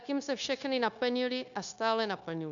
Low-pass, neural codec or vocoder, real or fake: 7.2 kHz; codec, 16 kHz, 0.9 kbps, LongCat-Audio-Codec; fake